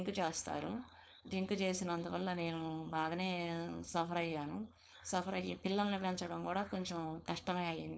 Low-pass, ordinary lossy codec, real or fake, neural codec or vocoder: none; none; fake; codec, 16 kHz, 4.8 kbps, FACodec